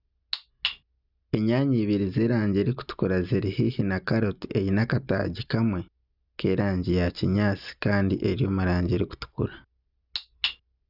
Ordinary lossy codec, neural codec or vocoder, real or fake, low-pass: none; none; real; 5.4 kHz